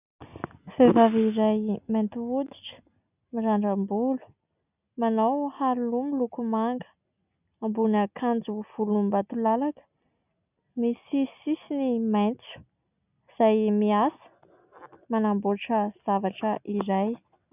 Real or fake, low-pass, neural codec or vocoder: real; 3.6 kHz; none